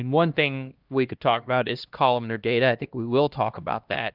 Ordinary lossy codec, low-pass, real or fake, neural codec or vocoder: Opus, 32 kbps; 5.4 kHz; fake; codec, 16 kHz, 1 kbps, X-Codec, HuBERT features, trained on LibriSpeech